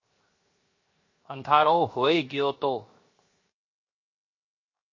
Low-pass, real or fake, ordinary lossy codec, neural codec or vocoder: 7.2 kHz; fake; MP3, 32 kbps; codec, 16 kHz, 0.7 kbps, FocalCodec